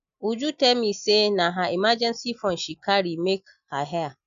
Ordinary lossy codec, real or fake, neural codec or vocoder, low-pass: none; real; none; 7.2 kHz